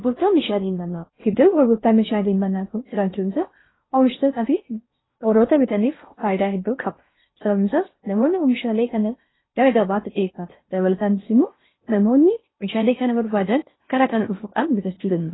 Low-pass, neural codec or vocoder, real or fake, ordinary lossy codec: 7.2 kHz; codec, 16 kHz in and 24 kHz out, 0.6 kbps, FocalCodec, streaming, 4096 codes; fake; AAC, 16 kbps